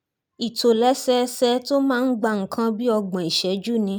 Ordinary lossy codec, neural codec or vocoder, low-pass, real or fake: none; none; none; real